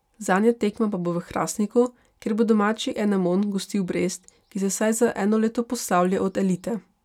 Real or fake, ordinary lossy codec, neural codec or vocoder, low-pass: real; none; none; 19.8 kHz